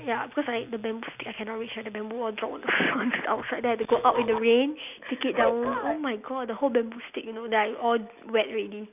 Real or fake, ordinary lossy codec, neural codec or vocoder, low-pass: real; none; none; 3.6 kHz